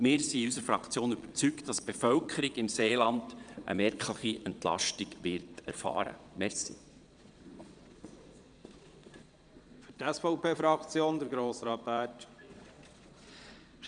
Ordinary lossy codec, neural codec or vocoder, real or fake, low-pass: none; vocoder, 22.05 kHz, 80 mel bands, Vocos; fake; 9.9 kHz